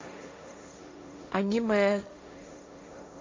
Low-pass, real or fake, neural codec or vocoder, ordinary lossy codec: 7.2 kHz; fake; codec, 16 kHz, 1.1 kbps, Voila-Tokenizer; MP3, 48 kbps